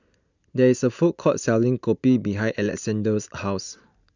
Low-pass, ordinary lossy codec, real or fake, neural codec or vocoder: 7.2 kHz; none; real; none